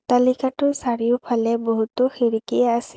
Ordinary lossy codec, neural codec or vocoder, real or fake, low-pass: none; none; real; none